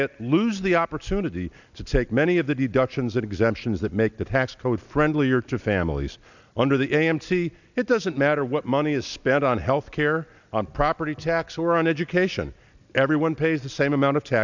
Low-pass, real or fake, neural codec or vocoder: 7.2 kHz; real; none